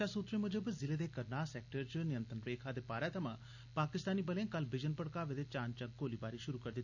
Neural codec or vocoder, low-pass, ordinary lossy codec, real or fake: none; 7.2 kHz; none; real